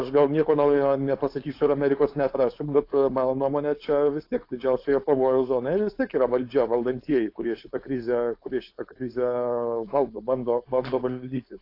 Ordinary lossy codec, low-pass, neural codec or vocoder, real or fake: AAC, 32 kbps; 5.4 kHz; codec, 16 kHz, 4.8 kbps, FACodec; fake